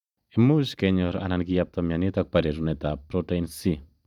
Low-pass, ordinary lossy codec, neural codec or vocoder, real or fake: 19.8 kHz; none; vocoder, 44.1 kHz, 128 mel bands every 512 samples, BigVGAN v2; fake